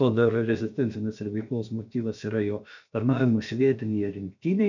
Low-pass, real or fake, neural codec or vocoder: 7.2 kHz; fake; codec, 16 kHz, about 1 kbps, DyCAST, with the encoder's durations